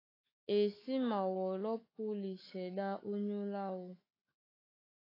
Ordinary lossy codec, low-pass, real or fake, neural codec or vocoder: AAC, 24 kbps; 5.4 kHz; fake; autoencoder, 48 kHz, 128 numbers a frame, DAC-VAE, trained on Japanese speech